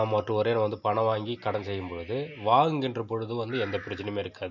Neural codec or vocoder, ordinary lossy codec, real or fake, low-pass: none; MP3, 48 kbps; real; 7.2 kHz